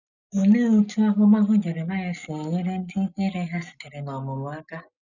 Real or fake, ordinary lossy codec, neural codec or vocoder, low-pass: real; none; none; 7.2 kHz